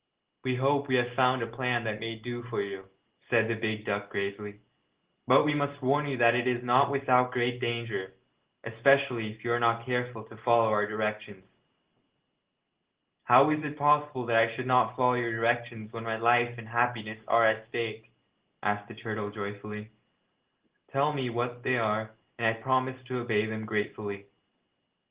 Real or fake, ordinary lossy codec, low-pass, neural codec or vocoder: real; Opus, 16 kbps; 3.6 kHz; none